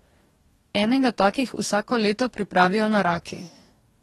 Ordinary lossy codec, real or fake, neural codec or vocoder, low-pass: AAC, 32 kbps; fake; codec, 44.1 kHz, 2.6 kbps, DAC; 19.8 kHz